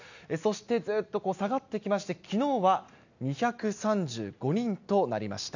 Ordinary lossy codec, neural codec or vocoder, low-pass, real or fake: none; none; 7.2 kHz; real